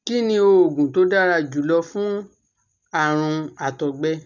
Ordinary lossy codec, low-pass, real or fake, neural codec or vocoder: none; 7.2 kHz; real; none